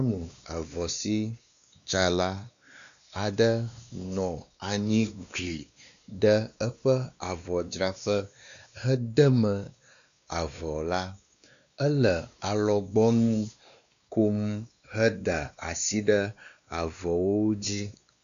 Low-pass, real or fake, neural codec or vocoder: 7.2 kHz; fake; codec, 16 kHz, 2 kbps, X-Codec, WavLM features, trained on Multilingual LibriSpeech